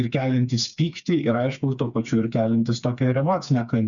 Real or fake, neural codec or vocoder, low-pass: fake; codec, 16 kHz, 4 kbps, FreqCodec, smaller model; 7.2 kHz